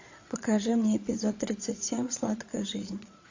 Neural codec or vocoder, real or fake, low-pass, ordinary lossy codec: vocoder, 22.05 kHz, 80 mel bands, Vocos; fake; 7.2 kHz; AAC, 48 kbps